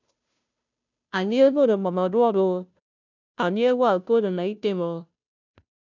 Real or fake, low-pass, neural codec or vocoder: fake; 7.2 kHz; codec, 16 kHz, 0.5 kbps, FunCodec, trained on Chinese and English, 25 frames a second